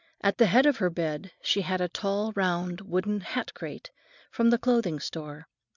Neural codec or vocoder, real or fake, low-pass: none; real; 7.2 kHz